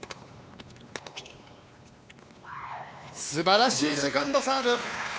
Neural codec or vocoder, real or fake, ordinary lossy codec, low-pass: codec, 16 kHz, 2 kbps, X-Codec, WavLM features, trained on Multilingual LibriSpeech; fake; none; none